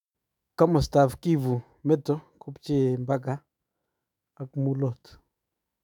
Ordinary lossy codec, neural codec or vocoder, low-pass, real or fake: none; autoencoder, 48 kHz, 128 numbers a frame, DAC-VAE, trained on Japanese speech; 19.8 kHz; fake